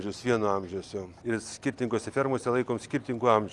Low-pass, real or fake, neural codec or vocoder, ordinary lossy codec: 10.8 kHz; real; none; Opus, 32 kbps